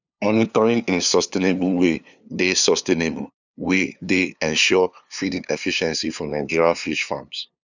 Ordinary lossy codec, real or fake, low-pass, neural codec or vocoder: none; fake; 7.2 kHz; codec, 16 kHz, 2 kbps, FunCodec, trained on LibriTTS, 25 frames a second